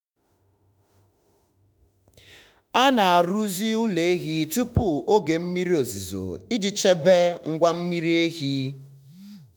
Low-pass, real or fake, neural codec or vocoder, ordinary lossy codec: none; fake; autoencoder, 48 kHz, 32 numbers a frame, DAC-VAE, trained on Japanese speech; none